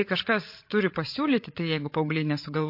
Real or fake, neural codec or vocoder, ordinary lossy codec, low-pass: fake; codec, 16 kHz, 16 kbps, FreqCodec, larger model; MP3, 32 kbps; 5.4 kHz